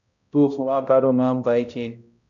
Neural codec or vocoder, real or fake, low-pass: codec, 16 kHz, 0.5 kbps, X-Codec, HuBERT features, trained on balanced general audio; fake; 7.2 kHz